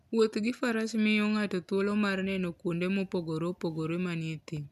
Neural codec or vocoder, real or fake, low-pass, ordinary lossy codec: none; real; 14.4 kHz; none